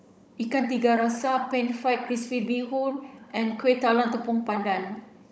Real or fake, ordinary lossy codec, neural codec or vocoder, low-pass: fake; none; codec, 16 kHz, 16 kbps, FunCodec, trained on Chinese and English, 50 frames a second; none